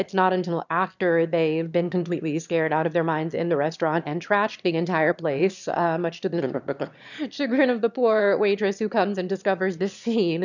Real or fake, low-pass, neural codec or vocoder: fake; 7.2 kHz; autoencoder, 22.05 kHz, a latent of 192 numbers a frame, VITS, trained on one speaker